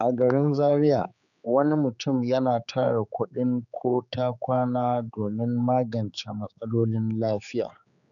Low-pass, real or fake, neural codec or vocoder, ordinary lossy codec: 7.2 kHz; fake; codec, 16 kHz, 4 kbps, X-Codec, HuBERT features, trained on general audio; none